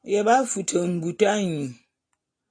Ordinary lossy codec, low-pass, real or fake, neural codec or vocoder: AAC, 48 kbps; 9.9 kHz; real; none